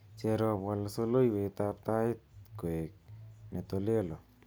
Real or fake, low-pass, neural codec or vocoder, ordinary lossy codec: real; none; none; none